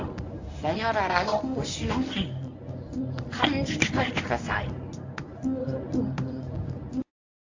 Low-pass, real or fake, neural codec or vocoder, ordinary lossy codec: 7.2 kHz; fake; codec, 24 kHz, 0.9 kbps, WavTokenizer, medium speech release version 1; AAC, 48 kbps